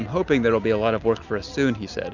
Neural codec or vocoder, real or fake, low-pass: none; real; 7.2 kHz